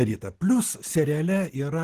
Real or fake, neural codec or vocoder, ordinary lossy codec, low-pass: real; none; Opus, 16 kbps; 14.4 kHz